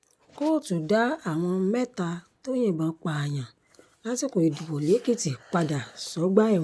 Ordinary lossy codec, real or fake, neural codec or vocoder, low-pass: none; real; none; none